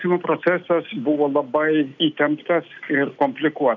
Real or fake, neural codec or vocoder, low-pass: real; none; 7.2 kHz